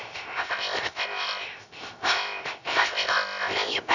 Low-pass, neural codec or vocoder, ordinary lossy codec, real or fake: 7.2 kHz; codec, 16 kHz, 0.3 kbps, FocalCodec; none; fake